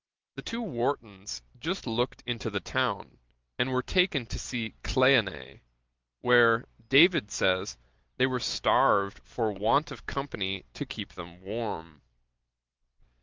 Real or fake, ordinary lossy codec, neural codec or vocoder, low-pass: real; Opus, 32 kbps; none; 7.2 kHz